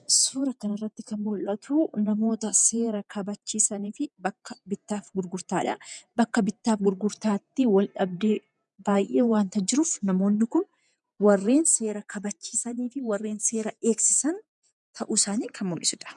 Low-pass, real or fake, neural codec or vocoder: 10.8 kHz; fake; vocoder, 48 kHz, 128 mel bands, Vocos